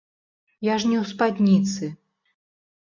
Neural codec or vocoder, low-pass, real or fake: none; 7.2 kHz; real